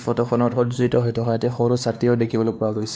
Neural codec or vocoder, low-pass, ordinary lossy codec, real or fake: codec, 16 kHz, 2 kbps, X-Codec, WavLM features, trained on Multilingual LibriSpeech; none; none; fake